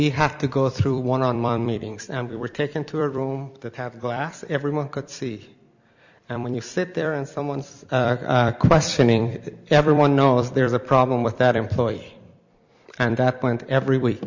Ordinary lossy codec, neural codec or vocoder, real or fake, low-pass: Opus, 64 kbps; vocoder, 44.1 kHz, 128 mel bands every 256 samples, BigVGAN v2; fake; 7.2 kHz